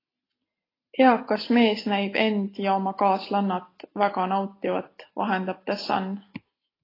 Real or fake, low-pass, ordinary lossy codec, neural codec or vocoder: real; 5.4 kHz; AAC, 24 kbps; none